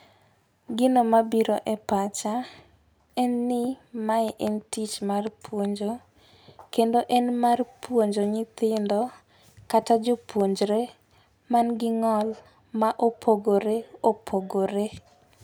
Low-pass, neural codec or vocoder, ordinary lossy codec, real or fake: none; none; none; real